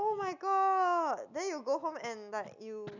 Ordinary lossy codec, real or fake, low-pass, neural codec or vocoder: none; real; 7.2 kHz; none